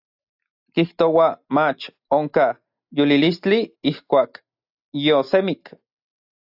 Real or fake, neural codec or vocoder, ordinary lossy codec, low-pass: real; none; AAC, 48 kbps; 5.4 kHz